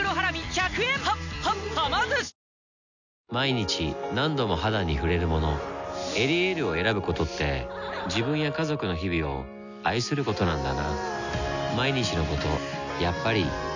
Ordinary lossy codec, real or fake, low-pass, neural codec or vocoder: none; real; 7.2 kHz; none